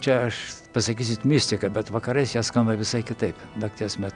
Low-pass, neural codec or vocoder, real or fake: 9.9 kHz; none; real